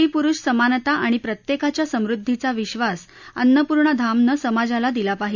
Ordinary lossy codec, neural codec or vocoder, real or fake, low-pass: none; none; real; 7.2 kHz